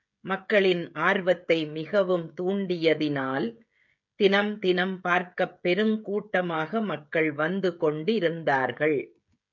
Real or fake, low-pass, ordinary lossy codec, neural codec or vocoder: fake; 7.2 kHz; MP3, 64 kbps; codec, 16 kHz, 16 kbps, FreqCodec, smaller model